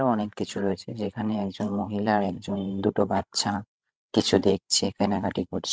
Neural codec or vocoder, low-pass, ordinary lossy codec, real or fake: codec, 16 kHz, 16 kbps, FreqCodec, larger model; none; none; fake